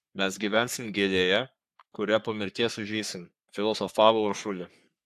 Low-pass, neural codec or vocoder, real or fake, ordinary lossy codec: 14.4 kHz; codec, 44.1 kHz, 3.4 kbps, Pupu-Codec; fake; AAC, 96 kbps